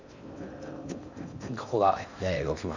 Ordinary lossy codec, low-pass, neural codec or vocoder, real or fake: Opus, 64 kbps; 7.2 kHz; codec, 16 kHz in and 24 kHz out, 0.6 kbps, FocalCodec, streaming, 4096 codes; fake